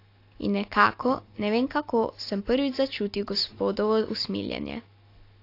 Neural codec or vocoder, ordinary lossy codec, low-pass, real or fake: none; AAC, 32 kbps; 5.4 kHz; real